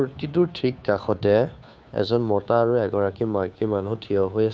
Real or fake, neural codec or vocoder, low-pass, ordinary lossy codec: fake; codec, 16 kHz, 2 kbps, FunCodec, trained on Chinese and English, 25 frames a second; none; none